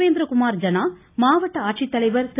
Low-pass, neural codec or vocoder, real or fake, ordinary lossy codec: 3.6 kHz; none; real; AAC, 16 kbps